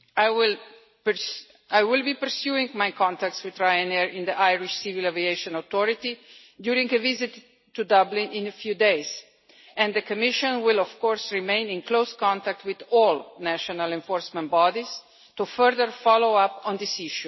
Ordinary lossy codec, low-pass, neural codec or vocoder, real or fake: MP3, 24 kbps; 7.2 kHz; none; real